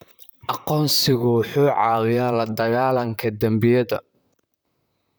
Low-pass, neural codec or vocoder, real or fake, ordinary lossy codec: none; vocoder, 44.1 kHz, 128 mel bands, Pupu-Vocoder; fake; none